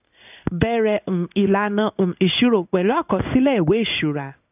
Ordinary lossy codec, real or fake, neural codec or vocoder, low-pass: none; fake; codec, 16 kHz in and 24 kHz out, 1 kbps, XY-Tokenizer; 3.6 kHz